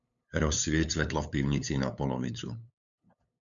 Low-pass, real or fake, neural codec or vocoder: 7.2 kHz; fake; codec, 16 kHz, 8 kbps, FunCodec, trained on LibriTTS, 25 frames a second